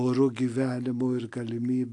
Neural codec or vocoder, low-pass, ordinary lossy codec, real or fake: none; 10.8 kHz; AAC, 48 kbps; real